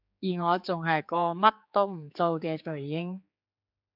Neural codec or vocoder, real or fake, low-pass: codec, 16 kHz, 4 kbps, X-Codec, HuBERT features, trained on general audio; fake; 5.4 kHz